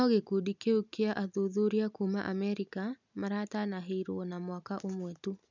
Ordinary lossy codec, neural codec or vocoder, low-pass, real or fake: none; none; 7.2 kHz; real